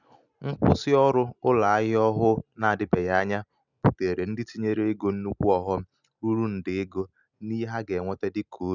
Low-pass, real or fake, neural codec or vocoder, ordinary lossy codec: 7.2 kHz; real; none; none